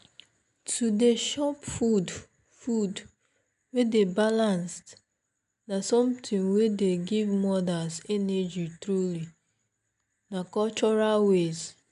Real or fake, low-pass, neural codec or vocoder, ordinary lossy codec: real; 10.8 kHz; none; none